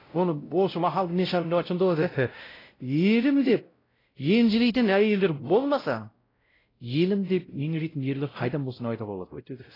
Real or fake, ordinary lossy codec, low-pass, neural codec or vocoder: fake; AAC, 24 kbps; 5.4 kHz; codec, 16 kHz, 0.5 kbps, X-Codec, WavLM features, trained on Multilingual LibriSpeech